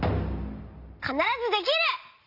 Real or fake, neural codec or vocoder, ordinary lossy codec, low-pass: real; none; Opus, 64 kbps; 5.4 kHz